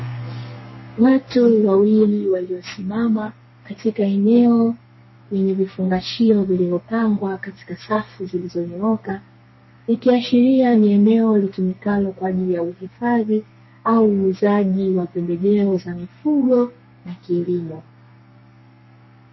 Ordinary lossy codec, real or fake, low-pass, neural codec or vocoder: MP3, 24 kbps; fake; 7.2 kHz; codec, 32 kHz, 1.9 kbps, SNAC